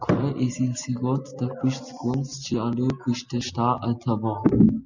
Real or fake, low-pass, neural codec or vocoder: real; 7.2 kHz; none